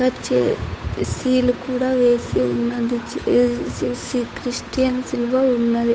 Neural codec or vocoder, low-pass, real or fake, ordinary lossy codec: codec, 16 kHz, 8 kbps, FunCodec, trained on Chinese and English, 25 frames a second; none; fake; none